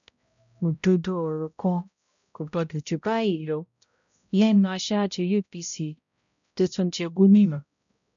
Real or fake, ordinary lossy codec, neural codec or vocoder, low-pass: fake; none; codec, 16 kHz, 0.5 kbps, X-Codec, HuBERT features, trained on balanced general audio; 7.2 kHz